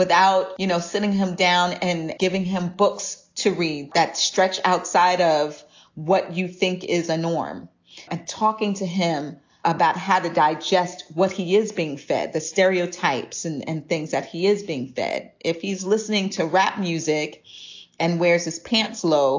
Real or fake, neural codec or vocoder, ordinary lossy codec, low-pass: real; none; AAC, 48 kbps; 7.2 kHz